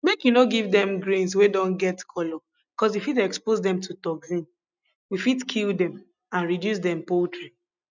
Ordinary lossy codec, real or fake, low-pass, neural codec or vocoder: none; real; 7.2 kHz; none